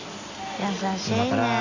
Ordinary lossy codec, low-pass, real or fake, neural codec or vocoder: Opus, 64 kbps; 7.2 kHz; real; none